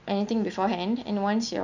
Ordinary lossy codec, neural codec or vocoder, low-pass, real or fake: none; none; 7.2 kHz; real